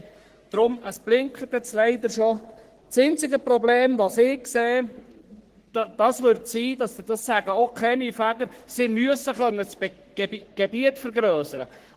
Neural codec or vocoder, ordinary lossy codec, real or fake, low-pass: codec, 44.1 kHz, 3.4 kbps, Pupu-Codec; Opus, 16 kbps; fake; 14.4 kHz